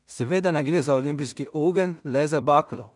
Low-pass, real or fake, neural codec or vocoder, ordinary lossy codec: 10.8 kHz; fake; codec, 16 kHz in and 24 kHz out, 0.4 kbps, LongCat-Audio-Codec, two codebook decoder; none